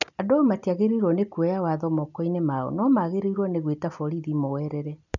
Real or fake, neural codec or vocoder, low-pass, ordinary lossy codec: real; none; 7.2 kHz; none